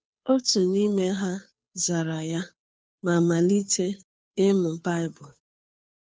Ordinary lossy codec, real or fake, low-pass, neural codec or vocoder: none; fake; none; codec, 16 kHz, 2 kbps, FunCodec, trained on Chinese and English, 25 frames a second